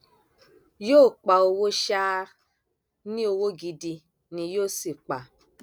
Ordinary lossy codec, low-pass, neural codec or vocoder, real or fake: none; none; none; real